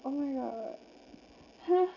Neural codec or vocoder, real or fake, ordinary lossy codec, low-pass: none; real; none; 7.2 kHz